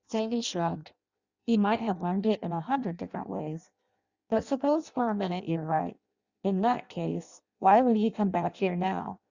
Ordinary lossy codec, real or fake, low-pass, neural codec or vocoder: Opus, 64 kbps; fake; 7.2 kHz; codec, 16 kHz in and 24 kHz out, 0.6 kbps, FireRedTTS-2 codec